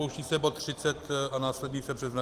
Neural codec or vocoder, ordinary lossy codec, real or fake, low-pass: none; Opus, 24 kbps; real; 14.4 kHz